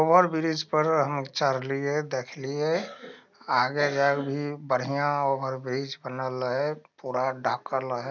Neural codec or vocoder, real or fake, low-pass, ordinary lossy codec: none; real; 7.2 kHz; none